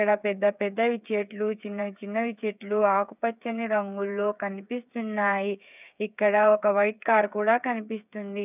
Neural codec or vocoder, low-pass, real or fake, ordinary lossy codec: codec, 16 kHz, 8 kbps, FreqCodec, smaller model; 3.6 kHz; fake; none